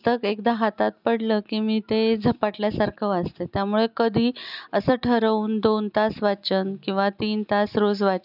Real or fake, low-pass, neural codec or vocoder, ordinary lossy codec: real; 5.4 kHz; none; none